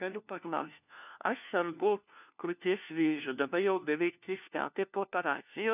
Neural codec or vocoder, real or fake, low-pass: codec, 16 kHz, 1 kbps, FunCodec, trained on LibriTTS, 50 frames a second; fake; 3.6 kHz